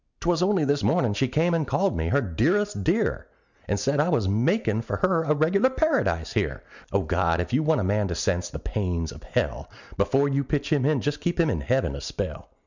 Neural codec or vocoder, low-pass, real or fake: none; 7.2 kHz; real